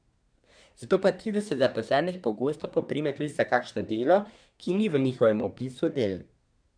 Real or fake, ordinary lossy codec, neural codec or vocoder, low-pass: fake; none; codec, 24 kHz, 1 kbps, SNAC; 9.9 kHz